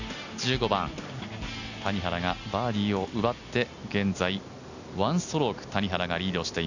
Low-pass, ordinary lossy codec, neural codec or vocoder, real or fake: 7.2 kHz; none; none; real